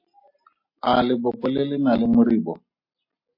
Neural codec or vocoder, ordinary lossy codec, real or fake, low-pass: none; MP3, 32 kbps; real; 5.4 kHz